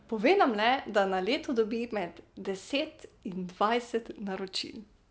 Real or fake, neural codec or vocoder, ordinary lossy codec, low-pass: real; none; none; none